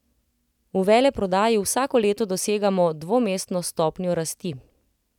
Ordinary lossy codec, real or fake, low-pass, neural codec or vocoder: none; real; 19.8 kHz; none